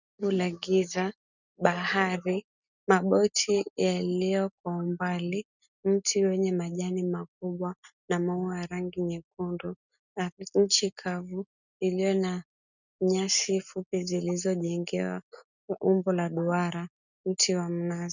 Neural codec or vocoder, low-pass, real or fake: none; 7.2 kHz; real